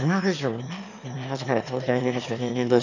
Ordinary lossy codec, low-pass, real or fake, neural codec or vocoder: none; 7.2 kHz; fake; autoencoder, 22.05 kHz, a latent of 192 numbers a frame, VITS, trained on one speaker